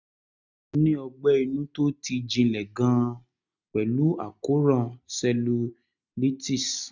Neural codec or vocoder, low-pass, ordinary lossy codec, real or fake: none; 7.2 kHz; none; real